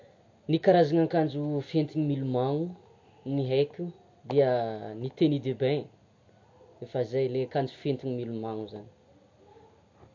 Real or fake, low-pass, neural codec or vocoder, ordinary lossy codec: real; 7.2 kHz; none; MP3, 48 kbps